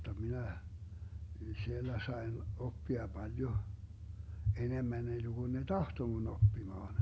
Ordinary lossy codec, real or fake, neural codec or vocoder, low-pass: none; real; none; none